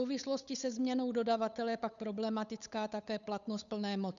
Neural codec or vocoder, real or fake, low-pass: codec, 16 kHz, 8 kbps, FunCodec, trained on Chinese and English, 25 frames a second; fake; 7.2 kHz